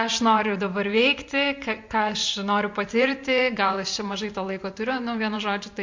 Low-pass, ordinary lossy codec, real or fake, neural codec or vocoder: 7.2 kHz; MP3, 48 kbps; fake; vocoder, 44.1 kHz, 128 mel bands every 512 samples, BigVGAN v2